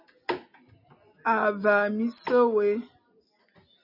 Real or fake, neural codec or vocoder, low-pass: real; none; 5.4 kHz